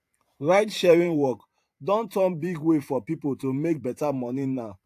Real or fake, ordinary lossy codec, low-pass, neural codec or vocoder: fake; AAC, 64 kbps; 14.4 kHz; vocoder, 44.1 kHz, 128 mel bands every 512 samples, BigVGAN v2